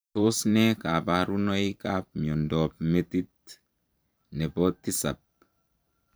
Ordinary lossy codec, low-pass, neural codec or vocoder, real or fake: none; none; vocoder, 44.1 kHz, 128 mel bands every 512 samples, BigVGAN v2; fake